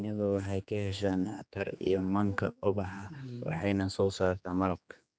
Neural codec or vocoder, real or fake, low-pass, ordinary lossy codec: codec, 16 kHz, 2 kbps, X-Codec, HuBERT features, trained on general audio; fake; none; none